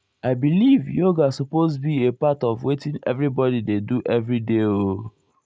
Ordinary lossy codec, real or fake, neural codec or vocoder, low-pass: none; real; none; none